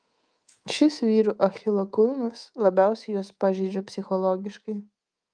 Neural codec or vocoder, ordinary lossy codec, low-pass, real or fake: codec, 24 kHz, 3.1 kbps, DualCodec; Opus, 32 kbps; 9.9 kHz; fake